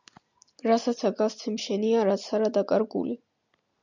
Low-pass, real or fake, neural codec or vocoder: 7.2 kHz; real; none